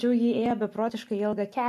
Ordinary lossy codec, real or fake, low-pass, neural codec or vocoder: MP3, 64 kbps; real; 14.4 kHz; none